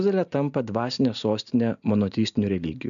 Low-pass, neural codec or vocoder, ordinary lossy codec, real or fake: 7.2 kHz; none; MP3, 96 kbps; real